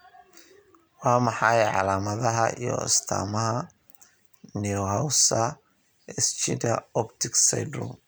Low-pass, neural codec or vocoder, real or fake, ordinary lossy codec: none; none; real; none